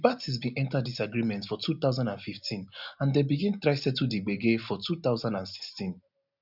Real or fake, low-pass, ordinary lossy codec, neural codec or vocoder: real; 5.4 kHz; none; none